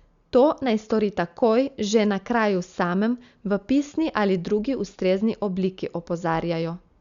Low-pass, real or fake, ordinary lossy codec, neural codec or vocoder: 7.2 kHz; real; Opus, 64 kbps; none